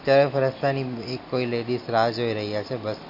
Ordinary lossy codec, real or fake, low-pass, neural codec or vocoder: MP3, 32 kbps; real; 5.4 kHz; none